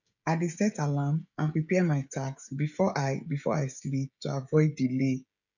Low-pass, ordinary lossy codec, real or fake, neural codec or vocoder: 7.2 kHz; none; fake; codec, 16 kHz, 16 kbps, FreqCodec, smaller model